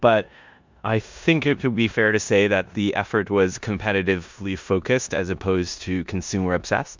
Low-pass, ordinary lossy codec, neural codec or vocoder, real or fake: 7.2 kHz; MP3, 64 kbps; codec, 16 kHz in and 24 kHz out, 0.9 kbps, LongCat-Audio-Codec, four codebook decoder; fake